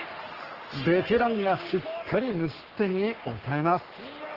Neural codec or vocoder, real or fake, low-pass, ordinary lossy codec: codec, 44.1 kHz, 3.4 kbps, Pupu-Codec; fake; 5.4 kHz; Opus, 16 kbps